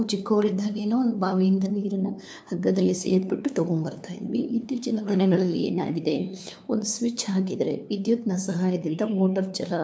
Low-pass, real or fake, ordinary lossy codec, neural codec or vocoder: none; fake; none; codec, 16 kHz, 2 kbps, FunCodec, trained on LibriTTS, 25 frames a second